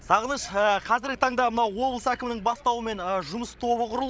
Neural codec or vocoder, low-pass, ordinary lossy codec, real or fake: codec, 16 kHz, 16 kbps, FreqCodec, larger model; none; none; fake